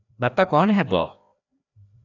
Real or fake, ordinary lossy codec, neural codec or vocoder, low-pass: fake; AAC, 48 kbps; codec, 16 kHz, 1 kbps, FreqCodec, larger model; 7.2 kHz